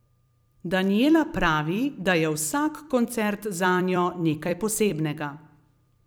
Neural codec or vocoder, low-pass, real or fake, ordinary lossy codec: vocoder, 44.1 kHz, 128 mel bands every 256 samples, BigVGAN v2; none; fake; none